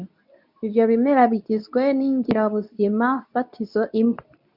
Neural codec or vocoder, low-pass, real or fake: codec, 24 kHz, 0.9 kbps, WavTokenizer, medium speech release version 2; 5.4 kHz; fake